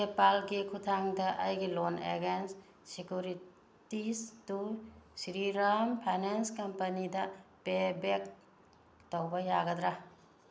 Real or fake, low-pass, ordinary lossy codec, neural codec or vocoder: real; none; none; none